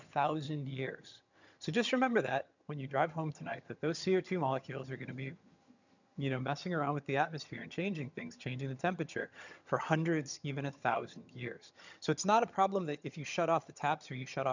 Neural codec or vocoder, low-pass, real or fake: vocoder, 22.05 kHz, 80 mel bands, HiFi-GAN; 7.2 kHz; fake